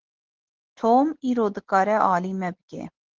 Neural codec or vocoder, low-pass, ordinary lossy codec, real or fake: none; 7.2 kHz; Opus, 16 kbps; real